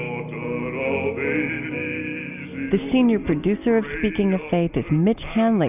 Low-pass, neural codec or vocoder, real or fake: 3.6 kHz; none; real